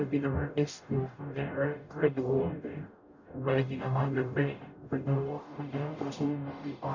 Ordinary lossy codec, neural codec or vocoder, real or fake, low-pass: none; codec, 44.1 kHz, 0.9 kbps, DAC; fake; 7.2 kHz